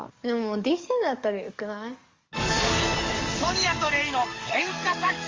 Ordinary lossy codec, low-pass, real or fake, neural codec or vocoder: Opus, 32 kbps; 7.2 kHz; fake; codec, 44.1 kHz, 7.8 kbps, DAC